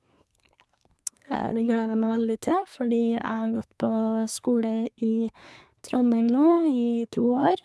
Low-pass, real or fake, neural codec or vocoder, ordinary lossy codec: none; fake; codec, 24 kHz, 1 kbps, SNAC; none